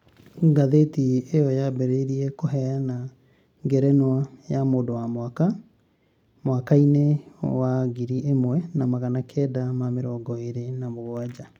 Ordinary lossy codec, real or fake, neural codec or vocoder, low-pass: none; real; none; 19.8 kHz